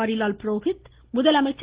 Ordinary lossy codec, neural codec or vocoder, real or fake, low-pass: Opus, 16 kbps; none; real; 3.6 kHz